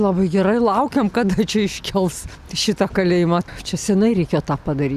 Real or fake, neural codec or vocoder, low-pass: real; none; 14.4 kHz